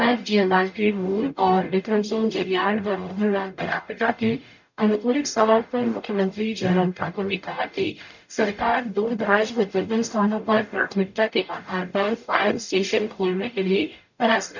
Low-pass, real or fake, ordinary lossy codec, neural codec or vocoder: 7.2 kHz; fake; none; codec, 44.1 kHz, 0.9 kbps, DAC